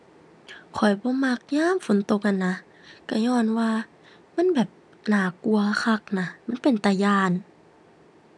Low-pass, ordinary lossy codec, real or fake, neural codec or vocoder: none; none; real; none